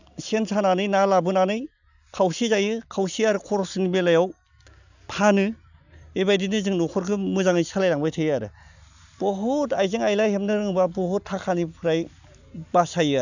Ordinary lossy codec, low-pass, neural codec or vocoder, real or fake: none; 7.2 kHz; none; real